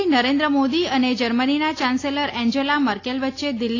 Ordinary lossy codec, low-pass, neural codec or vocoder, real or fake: AAC, 32 kbps; 7.2 kHz; none; real